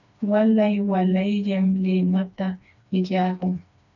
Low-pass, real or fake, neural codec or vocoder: 7.2 kHz; fake; codec, 16 kHz, 2 kbps, FreqCodec, smaller model